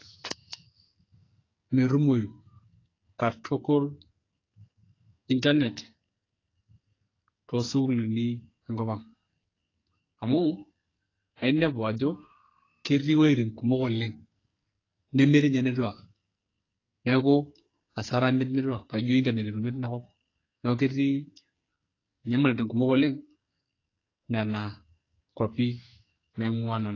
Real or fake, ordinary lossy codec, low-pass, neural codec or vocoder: fake; AAC, 32 kbps; 7.2 kHz; codec, 44.1 kHz, 2.6 kbps, SNAC